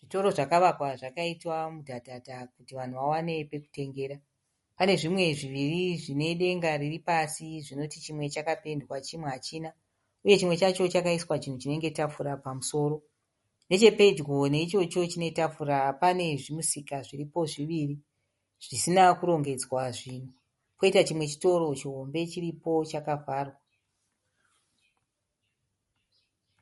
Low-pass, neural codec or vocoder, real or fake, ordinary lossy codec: 19.8 kHz; none; real; MP3, 48 kbps